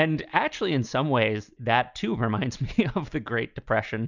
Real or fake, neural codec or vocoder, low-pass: real; none; 7.2 kHz